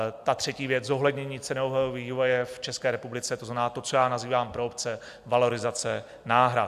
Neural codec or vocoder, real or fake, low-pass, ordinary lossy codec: none; real; 14.4 kHz; AAC, 96 kbps